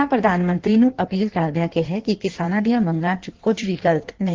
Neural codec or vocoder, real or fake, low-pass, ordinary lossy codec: codec, 16 kHz in and 24 kHz out, 1.1 kbps, FireRedTTS-2 codec; fake; 7.2 kHz; Opus, 16 kbps